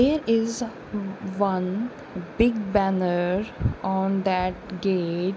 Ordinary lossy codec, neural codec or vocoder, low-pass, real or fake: none; none; none; real